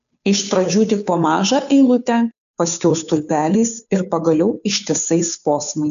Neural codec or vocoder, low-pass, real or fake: codec, 16 kHz, 2 kbps, FunCodec, trained on Chinese and English, 25 frames a second; 7.2 kHz; fake